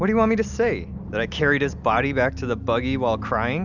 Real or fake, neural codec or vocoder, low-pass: real; none; 7.2 kHz